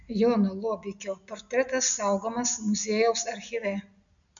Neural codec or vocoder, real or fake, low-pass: none; real; 7.2 kHz